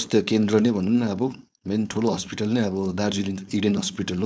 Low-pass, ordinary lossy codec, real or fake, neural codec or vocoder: none; none; fake; codec, 16 kHz, 4.8 kbps, FACodec